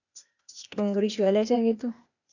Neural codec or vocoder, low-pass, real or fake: codec, 16 kHz, 0.8 kbps, ZipCodec; 7.2 kHz; fake